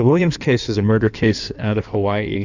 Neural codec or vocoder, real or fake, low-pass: codec, 16 kHz in and 24 kHz out, 1.1 kbps, FireRedTTS-2 codec; fake; 7.2 kHz